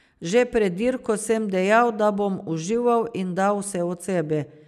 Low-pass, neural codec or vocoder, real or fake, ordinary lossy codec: 14.4 kHz; none; real; none